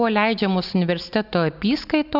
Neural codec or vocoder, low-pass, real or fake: none; 5.4 kHz; real